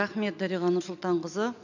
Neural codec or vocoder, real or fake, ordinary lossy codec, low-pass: none; real; none; 7.2 kHz